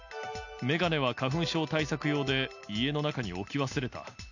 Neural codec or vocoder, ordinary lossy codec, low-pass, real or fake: none; none; 7.2 kHz; real